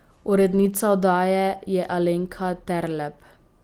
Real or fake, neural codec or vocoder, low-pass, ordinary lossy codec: real; none; 19.8 kHz; Opus, 24 kbps